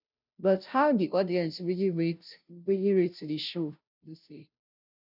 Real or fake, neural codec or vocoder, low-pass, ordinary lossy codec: fake; codec, 16 kHz, 0.5 kbps, FunCodec, trained on Chinese and English, 25 frames a second; 5.4 kHz; none